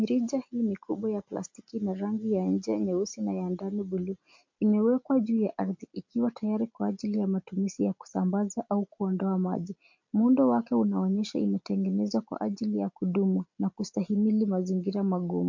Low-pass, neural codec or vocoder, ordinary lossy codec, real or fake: 7.2 kHz; none; MP3, 48 kbps; real